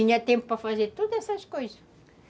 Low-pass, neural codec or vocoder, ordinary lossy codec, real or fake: none; none; none; real